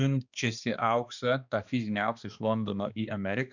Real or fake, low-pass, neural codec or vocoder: fake; 7.2 kHz; codec, 16 kHz, 2 kbps, X-Codec, HuBERT features, trained on balanced general audio